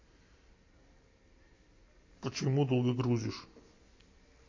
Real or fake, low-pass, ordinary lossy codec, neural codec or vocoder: real; 7.2 kHz; MP3, 32 kbps; none